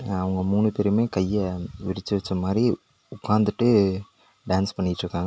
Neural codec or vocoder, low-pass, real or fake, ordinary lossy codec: none; none; real; none